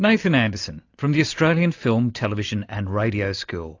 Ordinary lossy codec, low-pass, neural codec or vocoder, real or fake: AAC, 48 kbps; 7.2 kHz; none; real